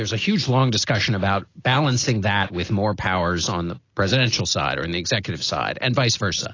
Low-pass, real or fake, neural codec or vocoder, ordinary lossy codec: 7.2 kHz; real; none; AAC, 32 kbps